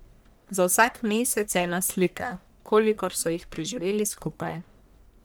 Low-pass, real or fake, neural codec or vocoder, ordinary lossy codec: none; fake; codec, 44.1 kHz, 1.7 kbps, Pupu-Codec; none